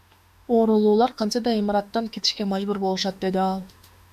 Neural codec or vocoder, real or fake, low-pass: autoencoder, 48 kHz, 32 numbers a frame, DAC-VAE, trained on Japanese speech; fake; 14.4 kHz